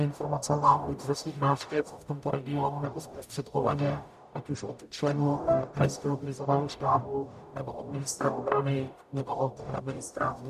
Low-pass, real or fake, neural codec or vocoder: 14.4 kHz; fake; codec, 44.1 kHz, 0.9 kbps, DAC